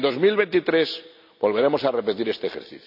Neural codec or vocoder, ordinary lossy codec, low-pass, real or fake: none; none; 5.4 kHz; real